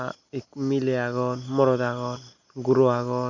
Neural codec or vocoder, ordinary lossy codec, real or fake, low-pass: none; none; real; 7.2 kHz